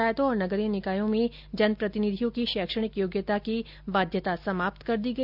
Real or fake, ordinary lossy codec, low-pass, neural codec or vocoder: real; none; 5.4 kHz; none